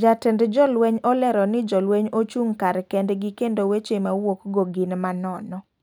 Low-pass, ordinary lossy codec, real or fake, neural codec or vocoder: 19.8 kHz; none; real; none